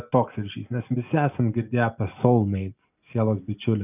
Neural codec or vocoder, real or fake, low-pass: none; real; 3.6 kHz